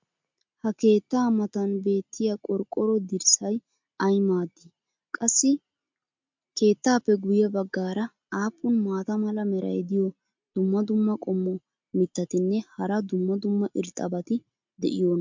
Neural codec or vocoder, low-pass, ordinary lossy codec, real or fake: none; 7.2 kHz; MP3, 64 kbps; real